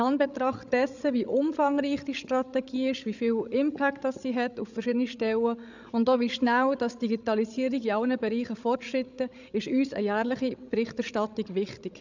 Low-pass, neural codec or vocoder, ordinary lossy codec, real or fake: 7.2 kHz; codec, 16 kHz, 16 kbps, FreqCodec, larger model; none; fake